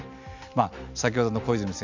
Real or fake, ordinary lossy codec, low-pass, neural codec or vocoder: real; none; 7.2 kHz; none